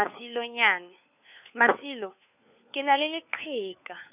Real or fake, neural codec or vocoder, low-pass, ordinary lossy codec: fake; codec, 16 kHz, 4 kbps, FunCodec, trained on LibriTTS, 50 frames a second; 3.6 kHz; none